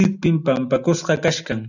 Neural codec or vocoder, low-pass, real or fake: none; 7.2 kHz; real